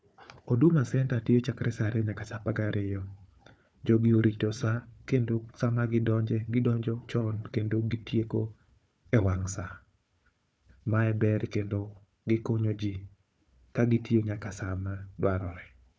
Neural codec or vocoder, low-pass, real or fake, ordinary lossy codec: codec, 16 kHz, 4 kbps, FunCodec, trained on Chinese and English, 50 frames a second; none; fake; none